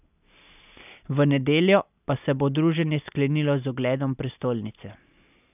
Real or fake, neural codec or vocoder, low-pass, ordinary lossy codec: real; none; 3.6 kHz; none